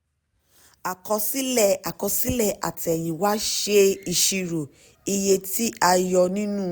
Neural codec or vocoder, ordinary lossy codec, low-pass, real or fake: none; none; none; real